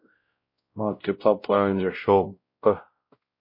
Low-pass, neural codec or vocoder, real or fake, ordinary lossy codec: 5.4 kHz; codec, 16 kHz, 0.5 kbps, X-Codec, WavLM features, trained on Multilingual LibriSpeech; fake; MP3, 32 kbps